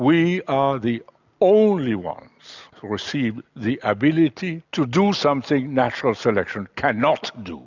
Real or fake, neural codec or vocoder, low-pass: real; none; 7.2 kHz